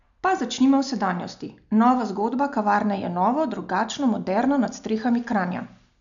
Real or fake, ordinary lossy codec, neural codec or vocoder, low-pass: real; none; none; 7.2 kHz